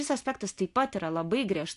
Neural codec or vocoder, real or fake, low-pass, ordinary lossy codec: none; real; 10.8 kHz; AAC, 64 kbps